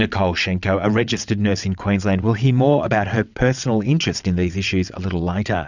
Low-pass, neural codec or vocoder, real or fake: 7.2 kHz; vocoder, 22.05 kHz, 80 mel bands, WaveNeXt; fake